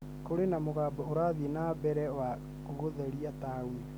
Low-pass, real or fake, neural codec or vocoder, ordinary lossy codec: none; real; none; none